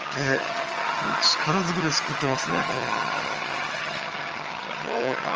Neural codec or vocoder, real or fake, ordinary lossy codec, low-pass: vocoder, 22.05 kHz, 80 mel bands, HiFi-GAN; fake; Opus, 24 kbps; 7.2 kHz